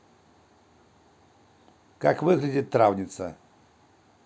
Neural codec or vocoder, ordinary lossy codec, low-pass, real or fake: none; none; none; real